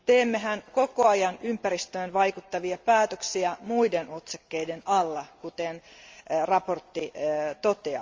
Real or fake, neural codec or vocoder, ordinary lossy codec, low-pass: real; none; Opus, 32 kbps; 7.2 kHz